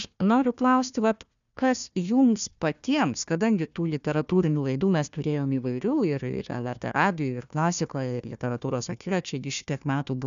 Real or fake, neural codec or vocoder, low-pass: fake; codec, 16 kHz, 1 kbps, FunCodec, trained on Chinese and English, 50 frames a second; 7.2 kHz